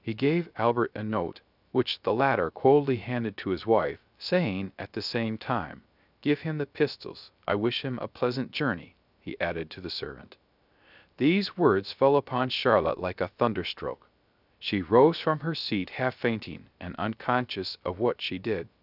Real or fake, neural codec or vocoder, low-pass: fake; codec, 16 kHz, 0.3 kbps, FocalCodec; 5.4 kHz